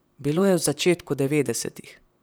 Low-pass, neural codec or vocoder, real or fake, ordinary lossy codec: none; vocoder, 44.1 kHz, 128 mel bands, Pupu-Vocoder; fake; none